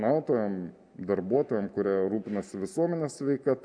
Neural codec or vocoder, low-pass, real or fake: none; 9.9 kHz; real